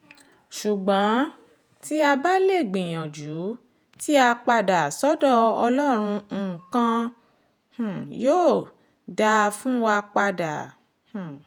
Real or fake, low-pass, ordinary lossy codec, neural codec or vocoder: fake; none; none; vocoder, 48 kHz, 128 mel bands, Vocos